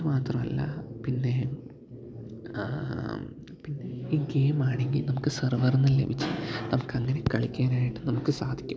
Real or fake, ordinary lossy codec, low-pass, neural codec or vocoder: real; none; none; none